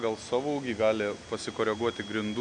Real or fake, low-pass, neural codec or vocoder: real; 9.9 kHz; none